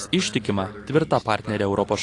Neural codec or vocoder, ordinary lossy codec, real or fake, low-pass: none; AAC, 48 kbps; real; 10.8 kHz